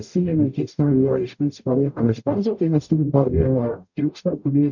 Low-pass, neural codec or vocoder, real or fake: 7.2 kHz; codec, 44.1 kHz, 0.9 kbps, DAC; fake